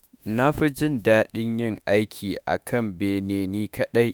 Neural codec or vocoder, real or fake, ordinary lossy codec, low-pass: autoencoder, 48 kHz, 32 numbers a frame, DAC-VAE, trained on Japanese speech; fake; none; none